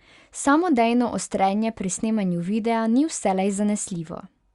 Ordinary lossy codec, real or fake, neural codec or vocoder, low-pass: Opus, 64 kbps; real; none; 10.8 kHz